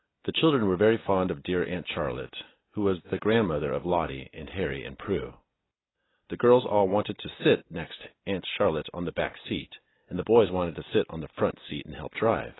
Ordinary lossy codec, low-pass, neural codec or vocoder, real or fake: AAC, 16 kbps; 7.2 kHz; none; real